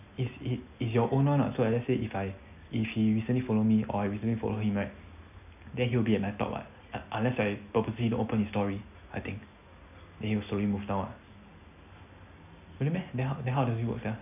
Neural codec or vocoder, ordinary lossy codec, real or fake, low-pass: none; none; real; 3.6 kHz